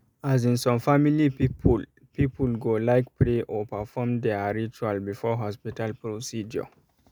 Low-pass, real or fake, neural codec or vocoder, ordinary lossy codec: none; real; none; none